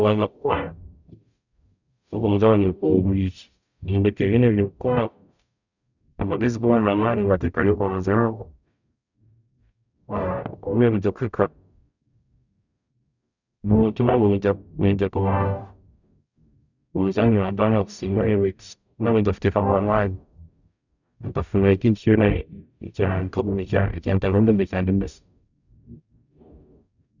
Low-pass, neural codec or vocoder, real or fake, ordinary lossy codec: 7.2 kHz; codec, 44.1 kHz, 0.9 kbps, DAC; fake; none